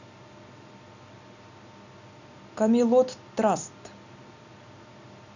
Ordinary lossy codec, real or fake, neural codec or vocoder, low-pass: none; real; none; 7.2 kHz